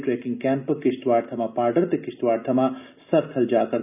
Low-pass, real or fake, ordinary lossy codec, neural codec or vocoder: 3.6 kHz; real; none; none